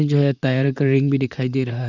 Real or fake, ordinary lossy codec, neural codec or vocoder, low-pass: fake; none; vocoder, 44.1 kHz, 128 mel bands, Pupu-Vocoder; 7.2 kHz